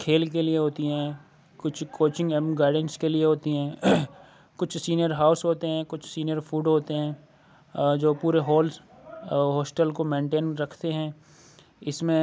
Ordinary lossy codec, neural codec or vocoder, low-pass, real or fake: none; none; none; real